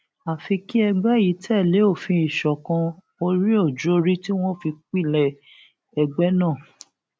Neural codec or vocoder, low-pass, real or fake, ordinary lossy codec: none; none; real; none